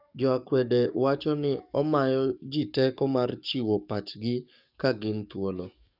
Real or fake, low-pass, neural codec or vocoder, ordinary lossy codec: fake; 5.4 kHz; codec, 44.1 kHz, 7.8 kbps, DAC; none